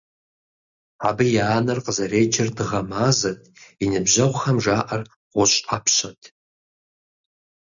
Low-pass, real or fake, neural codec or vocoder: 7.2 kHz; real; none